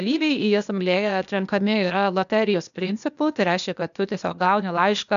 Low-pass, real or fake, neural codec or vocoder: 7.2 kHz; fake; codec, 16 kHz, 0.8 kbps, ZipCodec